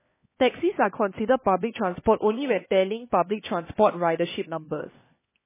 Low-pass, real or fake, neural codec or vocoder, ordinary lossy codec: 3.6 kHz; fake; codec, 16 kHz, 2 kbps, X-Codec, HuBERT features, trained on LibriSpeech; MP3, 16 kbps